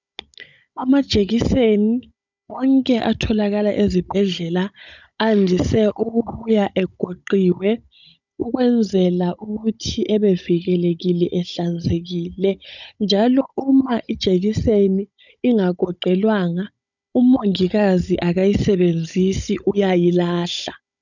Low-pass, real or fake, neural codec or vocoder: 7.2 kHz; fake; codec, 16 kHz, 16 kbps, FunCodec, trained on Chinese and English, 50 frames a second